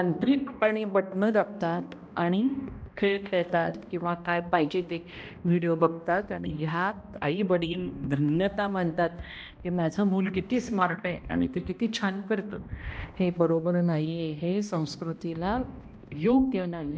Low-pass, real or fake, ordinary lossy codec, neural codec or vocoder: none; fake; none; codec, 16 kHz, 1 kbps, X-Codec, HuBERT features, trained on balanced general audio